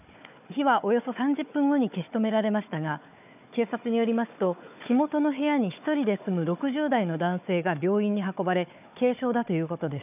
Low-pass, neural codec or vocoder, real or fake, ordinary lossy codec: 3.6 kHz; codec, 16 kHz, 4 kbps, FunCodec, trained on Chinese and English, 50 frames a second; fake; none